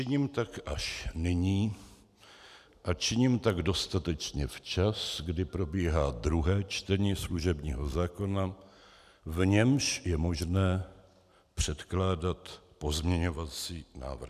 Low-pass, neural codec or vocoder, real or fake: 14.4 kHz; none; real